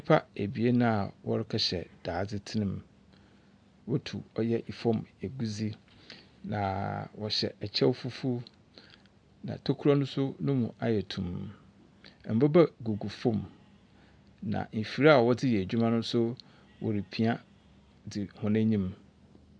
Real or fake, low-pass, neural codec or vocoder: real; 9.9 kHz; none